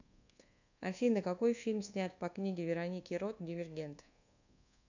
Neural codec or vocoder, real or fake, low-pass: codec, 24 kHz, 1.2 kbps, DualCodec; fake; 7.2 kHz